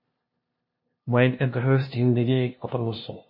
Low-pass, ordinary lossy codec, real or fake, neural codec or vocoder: 5.4 kHz; MP3, 24 kbps; fake; codec, 16 kHz, 0.5 kbps, FunCodec, trained on LibriTTS, 25 frames a second